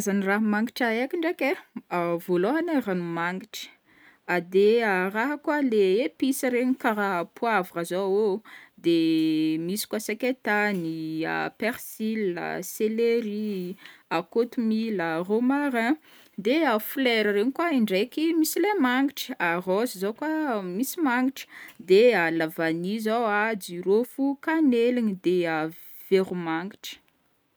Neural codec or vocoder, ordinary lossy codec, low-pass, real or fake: none; none; none; real